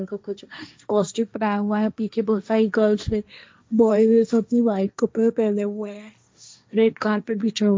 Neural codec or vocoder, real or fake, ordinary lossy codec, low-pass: codec, 16 kHz, 1.1 kbps, Voila-Tokenizer; fake; none; none